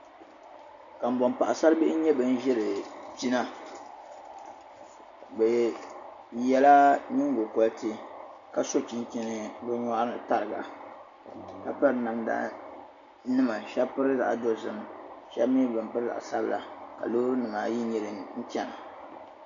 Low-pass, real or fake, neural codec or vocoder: 7.2 kHz; real; none